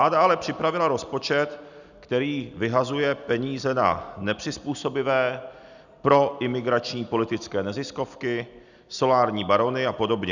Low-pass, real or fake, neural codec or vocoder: 7.2 kHz; real; none